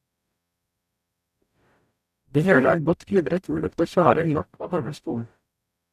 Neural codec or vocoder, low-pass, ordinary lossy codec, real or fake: codec, 44.1 kHz, 0.9 kbps, DAC; 14.4 kHz; none; fake